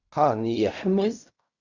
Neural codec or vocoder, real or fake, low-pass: codec, 16 kHz in and 24 kHz out, 0.4 kbps, LongCat-Audio-Codec, fine tuned four codebook decoder; fake; 7.2 kHz